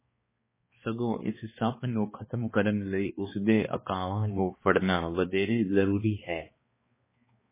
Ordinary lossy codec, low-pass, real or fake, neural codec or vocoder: MP3, 16 kbps; 3.6 kHz; fake; codec, 16 kHz, 2 kbps, X-Codec, HuBERT features, trained on balanced general audio